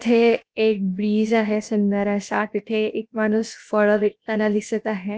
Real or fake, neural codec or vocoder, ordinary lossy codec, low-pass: fake; codec, 16 kHz, 0.7 kbps, FocalCodec; none; none